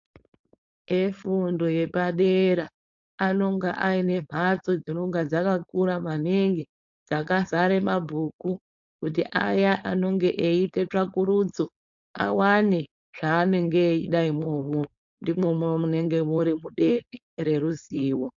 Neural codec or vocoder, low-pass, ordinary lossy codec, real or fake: codec, 16 kHz, 4.8 kbps, FACodec; 7.2 kHz; AAC, 64 kbps; fake